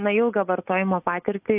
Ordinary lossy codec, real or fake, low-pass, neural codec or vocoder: AAC, 32 kbps; real; 3.6 kHz; none